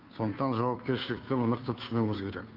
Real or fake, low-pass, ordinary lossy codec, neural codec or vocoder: fake; 5.4 kHz; Opus, 24 kbps; codec, 16 kHz, 2 kbps, FunCodec, trained on Chinese and English, 25 frames a second